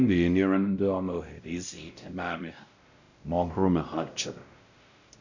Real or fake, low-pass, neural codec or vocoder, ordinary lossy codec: fake; 7.2 kHz; codec, 16 kHz, 0.5 kbps, X-Codec, WavLM features, trained on Multilingual LibriSpeech; none